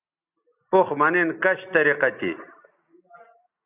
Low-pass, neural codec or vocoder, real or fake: 3.6 kHz; none; real